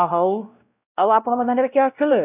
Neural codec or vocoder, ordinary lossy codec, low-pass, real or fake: codec, 16 kHz, 0.5 kbps, X-Codec, WavLM features, trained on Multilingual LibriSpeech; none; 3.6 kHz; fake